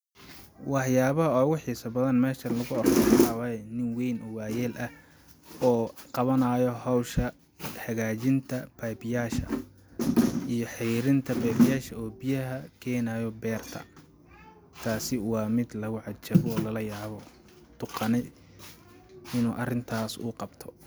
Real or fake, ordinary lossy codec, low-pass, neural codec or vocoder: real; none; none; none